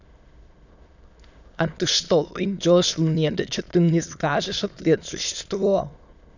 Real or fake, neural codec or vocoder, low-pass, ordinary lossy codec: fake; autoencoder, 22.05 kHz, a latent of 192 numbers a frame, VITS, trained on many speakers; 7.2 kHz; none